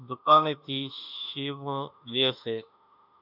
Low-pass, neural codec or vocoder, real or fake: 5.4 kHz; autoencoder, 48 kHz, 32 numbers a frame, DAC-VAE, trained on Japanese speech; fake